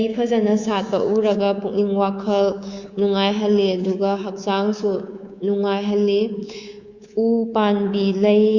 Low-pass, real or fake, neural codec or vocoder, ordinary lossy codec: 7.2 kHz; fake; codec, 24 kHz, 3.1 kbps, DualCodec; Opus, 64 kbps